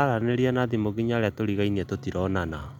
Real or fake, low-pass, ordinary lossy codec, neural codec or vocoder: real; 19.8 kHz; none; none